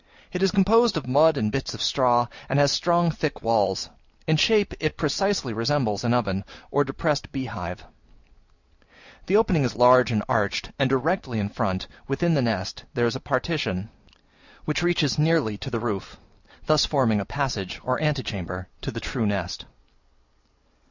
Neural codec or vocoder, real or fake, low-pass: none; real; 7.2 kHz